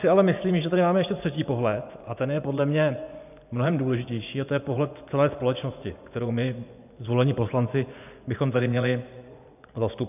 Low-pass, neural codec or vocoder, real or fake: 3.6 kHz; vocoder, 24 kHz, 100 mel bands, Vocos; fake